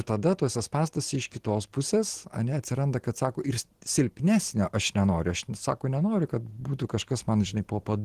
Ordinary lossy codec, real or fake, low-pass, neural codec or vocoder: Opus, 16 kbps; real; 14.4 kHz; none